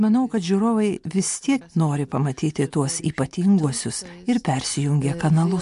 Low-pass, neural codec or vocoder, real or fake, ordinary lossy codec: 10.8 kHz; none; real; MP3, 64 kbps